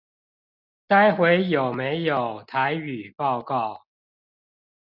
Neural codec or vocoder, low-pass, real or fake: none; 5.4 kHz; real